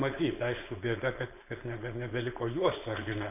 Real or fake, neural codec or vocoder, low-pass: fake; codec, 16 kHz, 4.8 kbps, FACodec; 3.6 kHz